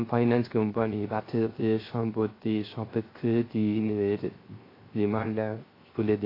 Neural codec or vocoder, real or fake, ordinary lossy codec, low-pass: codec, 16 kHz, 0.3 kbps, FocalCodec; fake; AAC, 24 kbps; 5.4 kHz